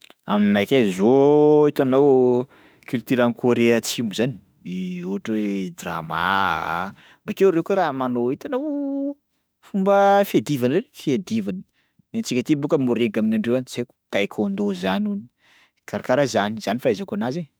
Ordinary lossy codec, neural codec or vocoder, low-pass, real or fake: none; autoencoder, 48 kHz, 32 numbers a frame, DAC-VAE, trained on Japanese speech; none; fake